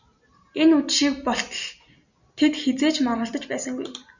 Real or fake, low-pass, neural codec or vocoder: real; 7.2 kHz; none